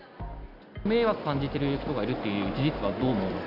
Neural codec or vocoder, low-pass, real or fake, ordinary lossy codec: none; 5.4 kHz; real; none